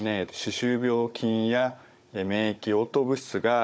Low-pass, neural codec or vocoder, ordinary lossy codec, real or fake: none; codec, 16 kHz, 16 kbps, FunCodec, trained on Chinese and English, 50 frames a second; none; fake